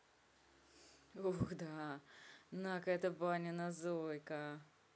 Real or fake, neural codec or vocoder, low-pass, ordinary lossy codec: real; none; none; none